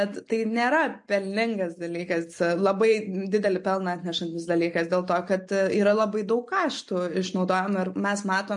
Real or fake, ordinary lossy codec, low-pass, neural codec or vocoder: real; MP3, 48 kbps; 10.8 kHz; none